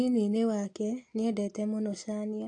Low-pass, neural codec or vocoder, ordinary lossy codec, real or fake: 9.9 kHz; none; AAC, 48 kbps; real